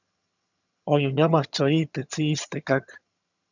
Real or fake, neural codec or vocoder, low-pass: fake; vocoder, 22.05 kHz, 80 mel bands, HiFi-GAN; 7.2 kHz